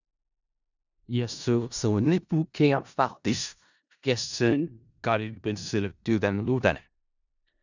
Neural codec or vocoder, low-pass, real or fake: codec, 16 kHz in and 24 kHz out, 0.4 kbps, LongCat-Audio-Codec, four codebook decoder; 7.2 kHz; fake